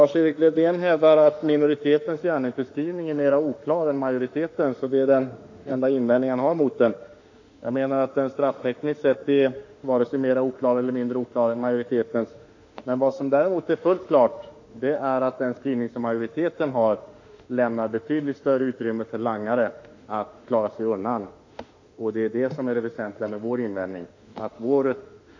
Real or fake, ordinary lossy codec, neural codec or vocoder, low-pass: fake; AAC, 48 kbps; autoencoder, 48 kHz, 32 numbers a frame, DAC-VAE, trained on Japanese speech; 7.2 kHz